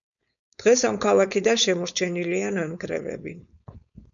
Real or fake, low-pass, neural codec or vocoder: fake; 7.2 kHz; codec, 16 kHz, 4.8 kbps, FACodec